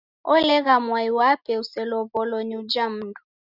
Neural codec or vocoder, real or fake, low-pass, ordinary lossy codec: none; real; 5.4 kHz; Opus, 64 kbps